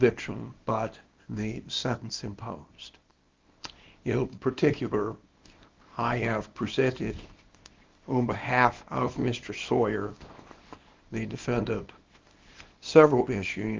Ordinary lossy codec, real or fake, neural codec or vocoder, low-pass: Opus, 32 kbps; fake; codec, 24 kHz, 0.9 kbps, WavTokenizer, small release; 7.2 kHz